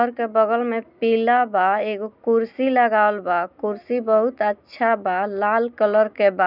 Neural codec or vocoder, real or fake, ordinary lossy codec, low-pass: none; real; none; 5.4 kHz